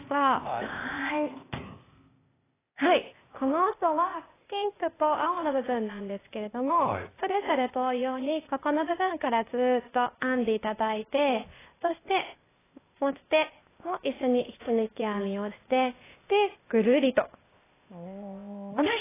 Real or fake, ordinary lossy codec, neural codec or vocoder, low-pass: fake; AAC, 16 kbps; codec, 16 kHz, 0.8 kbps, ZipCodec; 3.6 kHz